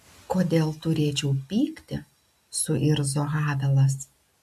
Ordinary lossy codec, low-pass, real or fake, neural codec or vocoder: MP3, 96 kbps; 14.4 kHz; real; none